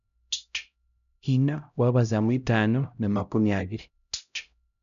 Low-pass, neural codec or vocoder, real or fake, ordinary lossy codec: 7.2 kHz; codec, 16 kHz, 0.5 kbps, X-Codec, HuBERT features, trained on LibriSpeech; fake; none